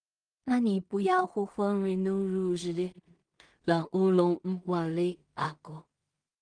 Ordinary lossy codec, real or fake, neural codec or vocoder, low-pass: Opus, 32 kbps; fake; codec, 16 kHz in and 24 kHz out, 0.4 kbps, LongCat-Audio-Codec, two codebook decoder; 9.9 kHz